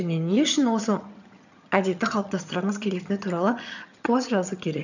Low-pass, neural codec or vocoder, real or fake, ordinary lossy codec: 7.2 kHz; vocoder, 22.05 kHz, 80 mel bands, HiFi-GAN; fake; none